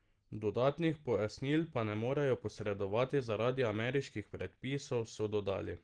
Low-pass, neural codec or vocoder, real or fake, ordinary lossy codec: 9.9 kHz; none; real; Opus, 16 kbps